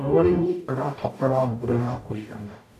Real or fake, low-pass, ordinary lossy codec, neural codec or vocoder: fake; 14.4 kHz; none; codec, 44.1 kHz, 0.9 kbps, DAC